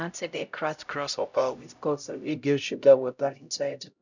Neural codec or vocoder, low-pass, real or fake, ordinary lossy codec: codec, 16 kHz, 0.5 kbps, X-Codec, HuBERT features, trained on LibriSpeech; 7.2 kHz; fake; none